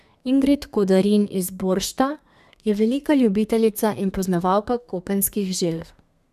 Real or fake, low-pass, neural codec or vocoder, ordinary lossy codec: fake; 14.4 kHz; codec, 32 kHz, 1.9 kbps, SNAC; none